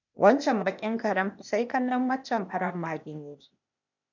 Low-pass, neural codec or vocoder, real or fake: 7.2 kHz; codec, 16 kHz, 0.8 kbps, ZipCodec; fake